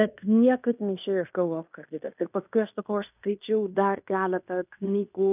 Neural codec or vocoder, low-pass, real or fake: codec, 16 kHz in and 24 kHz out, 0.9 kbps, LongCat-Audio-Codec, fine tuned four codebook decoder; 3.6 kHz; fake